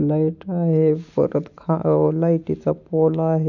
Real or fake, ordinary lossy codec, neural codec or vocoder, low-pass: real; none; none; 7.2 kHz